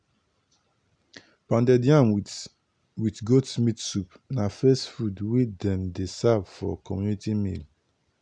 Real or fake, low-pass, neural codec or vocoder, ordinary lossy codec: real; 9.9 kHz; none; none